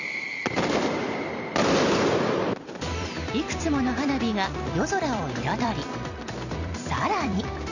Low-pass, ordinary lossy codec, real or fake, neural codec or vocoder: 7.2 kHz; none; fake; vocoder, 44.1 kHz, 128 mel bands every 256 samples, BigVGAN v2